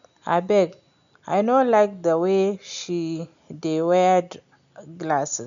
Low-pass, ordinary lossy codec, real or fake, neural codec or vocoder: 7.2 kHz; none; real; none